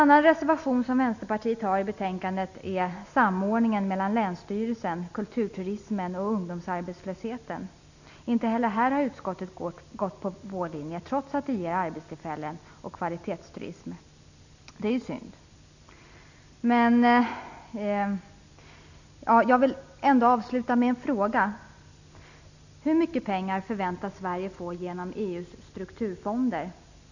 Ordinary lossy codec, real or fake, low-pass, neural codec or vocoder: none; real; 7.2 kHz; none